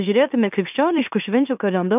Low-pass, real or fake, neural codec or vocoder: 3.6 kHz; fake; autoencoder, 44.1 kHz, a latent of 192 numbers a frame, MeloTTS